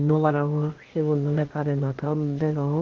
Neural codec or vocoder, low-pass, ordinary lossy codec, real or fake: codec, 16 kHz, 0.8 kbps, ZipCodec; 7.2 kHz; Opus, 16 kbps; fake